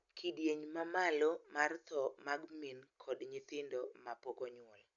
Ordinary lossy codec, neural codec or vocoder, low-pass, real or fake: none; none; 7.2 kHz; real